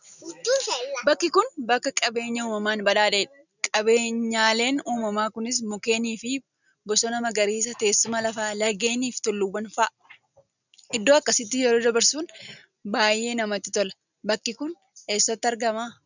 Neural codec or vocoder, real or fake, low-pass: none; real; 7.2 kHz